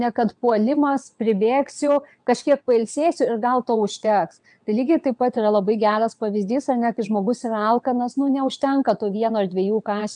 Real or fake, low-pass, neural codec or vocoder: fake; 9.9 kHz; vocoder, 22.05 kHz, 80 mel bands, WaveNeXt